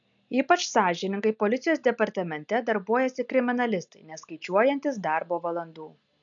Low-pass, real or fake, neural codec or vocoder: 7.2 kHz; real; none